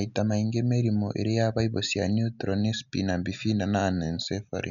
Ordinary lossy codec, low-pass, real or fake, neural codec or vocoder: none; 7.2 kHz; real; none